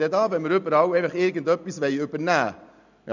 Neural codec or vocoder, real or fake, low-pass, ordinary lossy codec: none; real; 7.2 kHz; none